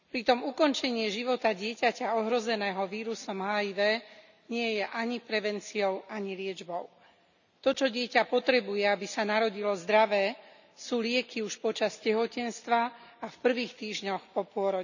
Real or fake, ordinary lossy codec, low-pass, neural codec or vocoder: real; none; 7.2 kHz; none